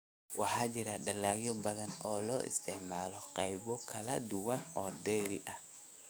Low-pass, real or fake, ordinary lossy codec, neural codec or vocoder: none; fake; none; codec, 44.1 kHz, 7.8 kbps, DAC